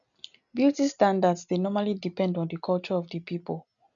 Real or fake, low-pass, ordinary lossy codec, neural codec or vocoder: real; 7.2 kHz; none; none